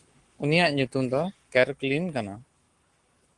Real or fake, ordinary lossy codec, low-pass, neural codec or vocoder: fake; Opus, 24 kbps; 10.8 kHz; codec, 44.1 kHz, 7.8 kbps, Pupu-Codec